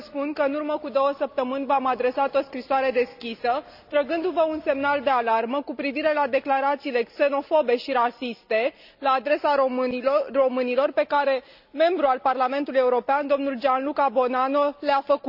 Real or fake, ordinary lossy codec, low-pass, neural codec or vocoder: real; none; 5.4 kHz; none